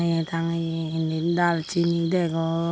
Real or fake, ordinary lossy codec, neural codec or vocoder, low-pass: real; none; none; none